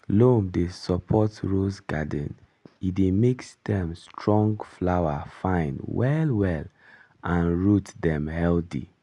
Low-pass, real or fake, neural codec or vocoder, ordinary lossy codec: 10.8 kHz; real; none; MP3, 96 kbps